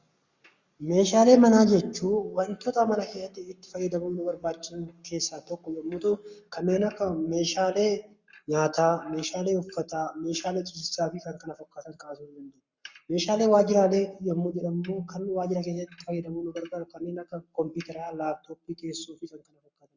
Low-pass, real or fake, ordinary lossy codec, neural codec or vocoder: 7.2 kHz; fake; Opus, 64 kbps; codec, 44.1 kHz, 7.8 kbps, Pupu-Codec